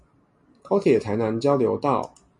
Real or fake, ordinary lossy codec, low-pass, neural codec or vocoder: real; MP3, 48 kbps; 10.8 kHz; none